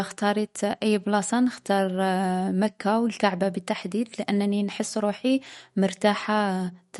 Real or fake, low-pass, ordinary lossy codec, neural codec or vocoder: fake; 19.8 kHz; MP3, 48 kbps; autoencoder, 48 kHz, 128 numbers a frame, DAC-VAE, trained on Japanese speech